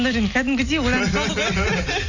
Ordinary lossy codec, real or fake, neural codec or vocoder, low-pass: none; real; none; 7.2 kHz